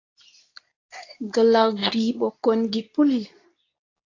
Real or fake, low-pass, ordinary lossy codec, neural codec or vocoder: fake; 7.2 kHz; AAC, 32 kbps; codec, 24 kHz, 0.9 kbps, WavTokenizer, medium speech release version 2